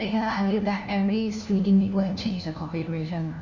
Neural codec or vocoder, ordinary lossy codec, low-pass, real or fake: codec, 16 kHz, 1 kbps, FunCodec, trained on LibriTTS, 50 frames a second; none; 7.2 kHz; fake